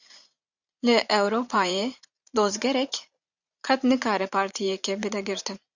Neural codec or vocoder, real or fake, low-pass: vocoder, 44.1 kHz, 80 mel bands, Vocos; fake; 7.2 kHz